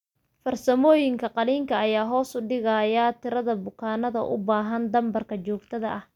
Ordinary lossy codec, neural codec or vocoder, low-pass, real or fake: none; none; 19.8 kHz; real